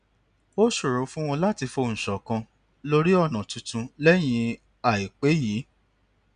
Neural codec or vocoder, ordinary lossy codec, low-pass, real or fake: none; none; 9.9 kHz; real